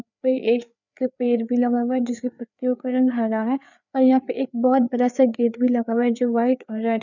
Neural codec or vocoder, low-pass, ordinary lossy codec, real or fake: codec, 16 kHz, 4 kbps, FreqCodec, larger model; 7.2 kHz; none; fake